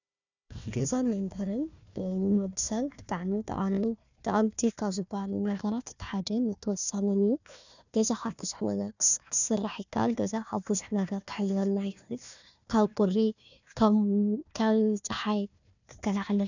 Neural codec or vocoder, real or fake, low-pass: codec, 16 kHz, 1 kbps, FunCodec, trained on Chinese and English, 50 frames a second; fake; 7.2 kHz